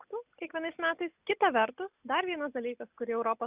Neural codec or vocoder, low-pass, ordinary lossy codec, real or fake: none; 3.6 kHz; Opus, 64 kbps; real